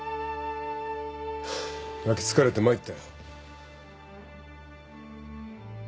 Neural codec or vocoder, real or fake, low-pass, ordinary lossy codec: none; real; none; none